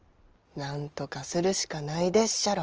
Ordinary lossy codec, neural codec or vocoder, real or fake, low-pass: Opus, 24 kbps; none; real; 7.2 kHz